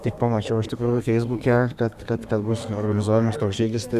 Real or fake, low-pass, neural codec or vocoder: fake; 14.4 kHz; codec, 32 kHz, 1.9 kbps, SNAC